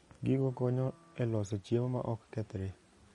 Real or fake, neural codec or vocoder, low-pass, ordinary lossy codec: real; none; 19.8 kHz; MP3, 48 kbps